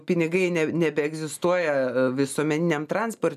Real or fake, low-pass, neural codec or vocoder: real; 14.4 kHz; none